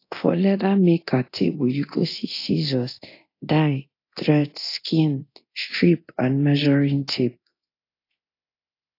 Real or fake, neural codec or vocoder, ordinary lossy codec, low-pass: fake; codec, 24 kHz, 0.9 kbps, DualCodec; AAC, 32 kbps; 5.4 kHz